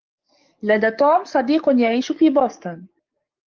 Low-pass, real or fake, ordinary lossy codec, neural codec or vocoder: 7.2 kHz; fake; Opus, 24 kbps; codec, 44.1 kHz, 7.8 kbps, DAC